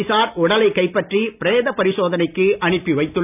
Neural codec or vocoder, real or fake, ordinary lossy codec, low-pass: none; real; none; 3.6 kHz